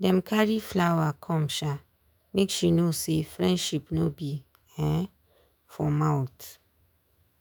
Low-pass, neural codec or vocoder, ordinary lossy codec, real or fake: none; autoencoder, 48 kHz, 128 numbers a frame, DAC-VAE, trained on Japanese speech; none; fake